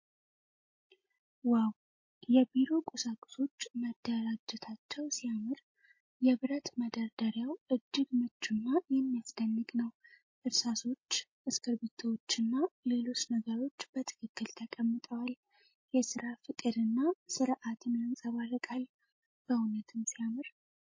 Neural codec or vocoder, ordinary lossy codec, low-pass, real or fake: none; MP3, 32 kbps; 7.2 kHz; real